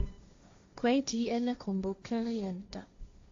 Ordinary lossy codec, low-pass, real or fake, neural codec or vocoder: AAC, 48 kbps; 7.2 kHz; fake; codec, 16 kHz, 1.1 kbps, Voila-Tokenizer